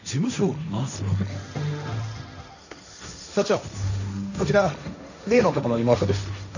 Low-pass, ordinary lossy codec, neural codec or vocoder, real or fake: 7.2 kHz; none; codec, 16 kHz, 1.1 kbps, Voila-Tokenizer; fake